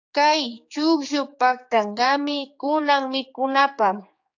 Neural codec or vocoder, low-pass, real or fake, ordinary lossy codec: codec, 16 kHz, 4 kbps, X-Codec, HuBERT features, trained on general audio; 7.2 kHz; fake; AAC, 48 kbps